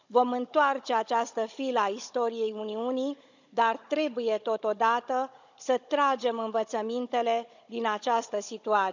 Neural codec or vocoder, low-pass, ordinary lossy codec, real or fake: codec, 16 kHz, 16 kbps, FunCodec, trained on Chinese and English, 50 frames a second; 7.2 kHz; none; fake